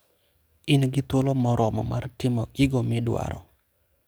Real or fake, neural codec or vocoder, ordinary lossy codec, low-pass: fake; codec, 44.1 kHz, 7.8 kbps, DAC; none; none